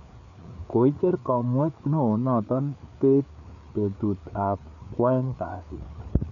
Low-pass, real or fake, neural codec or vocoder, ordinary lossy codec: 7.2 kHz; fake; codec, 16 kHz, 4 kbps, FreqCodec, larger model; none